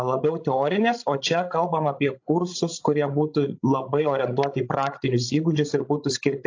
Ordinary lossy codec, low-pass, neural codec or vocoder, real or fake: AAC, 48 kbps; 7.2 kHz; codec, 16 kHz, 16 kbps, FreqCodec, larger model; fake